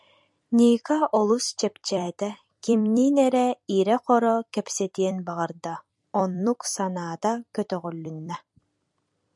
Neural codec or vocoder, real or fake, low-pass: vocoder, 44.1 kHz, 128 mel bands every 256 samples, BigVGAN v2; fake; 10.8 kHz